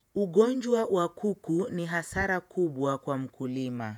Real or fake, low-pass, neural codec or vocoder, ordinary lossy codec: fake; 19.8 kHz; vocoder, 48 kHz, 128 mel bands, Vocos; none